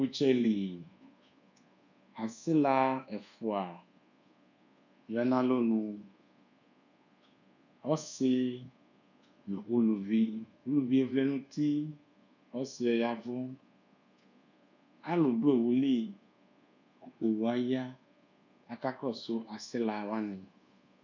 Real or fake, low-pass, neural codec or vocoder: fake; 7.2 kHz; codec, 24 kHz, 1.2 kbps, DualCodec